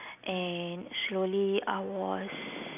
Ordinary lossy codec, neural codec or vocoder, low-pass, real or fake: none; none; 3.6 kHz; real